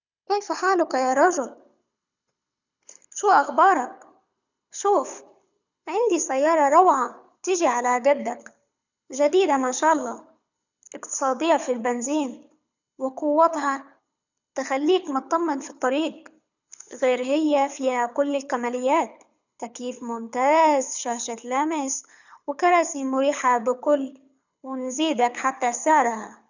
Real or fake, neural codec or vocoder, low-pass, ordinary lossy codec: fake; codec, 24 kHz, 6 kbps, HILCodec; 7.2 kHz; none